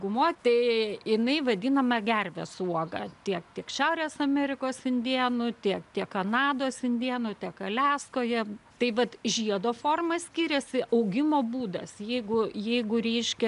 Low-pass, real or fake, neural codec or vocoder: 10.8 kHz; real; none